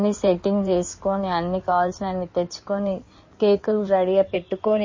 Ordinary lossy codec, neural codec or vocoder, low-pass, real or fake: MP3, 32 kbps; codec, 16 kHz in and 24 kHz out, 2.2 kbps, FireRedTTS-2 codec; 7.2 kHz; fake